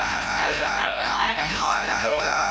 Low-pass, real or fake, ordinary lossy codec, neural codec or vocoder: none; fake; none; codec, 16 kHz, 0.5 kbps, FreqCodec, larger model